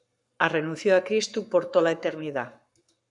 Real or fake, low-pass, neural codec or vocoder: fake; 10.8 kHz; codec, 44.1 kHz, 7.8 kbps, Pupu-Codec